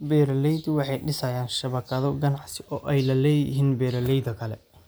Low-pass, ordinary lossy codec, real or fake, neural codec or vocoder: none; none; real; none